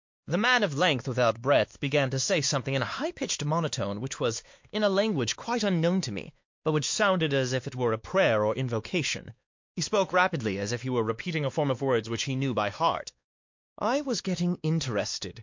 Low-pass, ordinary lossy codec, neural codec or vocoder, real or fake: 7.2 kHz; MP3, 48 kbps; codec, 16 kHz, 2 kbps, X-Codec, WavLM features, trained on Multilingual LibriSpeech; fake